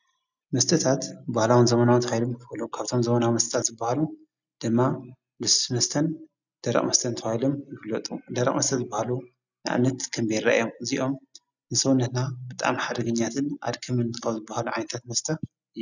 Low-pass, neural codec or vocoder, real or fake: 7.2 kHz; none; real